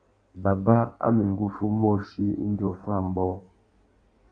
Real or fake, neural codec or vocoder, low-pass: fake; codec, 16 kHz in and 24 kHz out, 1.1 kbps, FireRedTTS-2 codec; 9.9 kHz